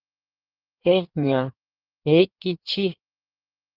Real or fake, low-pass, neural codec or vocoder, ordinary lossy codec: fake; 5.4 kHz; codec, 16 kHz in and 24 kHz out, 1.1 kbps, FireRedTTS-2 codec; Opus, 24 kbps